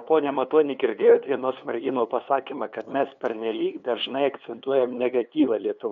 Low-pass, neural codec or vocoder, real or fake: 7.2 kHz; codec, 16 kHz, 2 kbps, FunCodec, trained on LibriTTS, 25 frames a second; fake